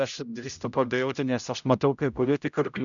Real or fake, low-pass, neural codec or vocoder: fake; 7.2 kHz; codec, 16 kHz, 0.5 kbps, X-Codec, HuBERT features, trained on general audio